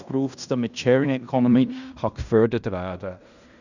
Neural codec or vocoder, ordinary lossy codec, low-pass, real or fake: codec, 16 kHz in and 24 kHz out, 0.9 kbps, LongCat-Audio-Codec, fine tuned four codebook decoder; none; 7.2 kHz; fake